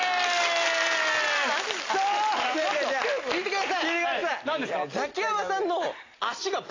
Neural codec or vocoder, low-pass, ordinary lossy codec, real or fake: none; 7.2 kHz; AAC, 32 kbps; real